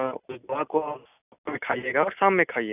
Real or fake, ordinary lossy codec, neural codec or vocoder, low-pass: real; none; none; 3.6 kHz